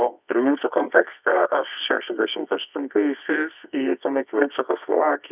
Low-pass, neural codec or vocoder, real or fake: 3.6 kHz; codec, 24 kHz, 0.9 kbps, WavTokenizer, medium music audio release; fake